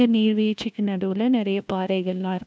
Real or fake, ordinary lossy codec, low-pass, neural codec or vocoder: fake; none; none; codec, 16 kHz, 1 kbps, FunCodec, trained on LibriTTS, 50 frames a second